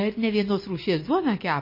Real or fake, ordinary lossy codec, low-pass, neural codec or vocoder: real; MP3, 24 kbps; 5.4 kHz; none